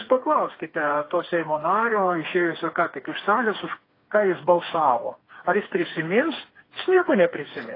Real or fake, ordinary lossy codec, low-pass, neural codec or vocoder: fake; AAC, 24 kbps; 5.4 kHz; codec, 16 kHz, 4 kbps, FreqCodec, smaller model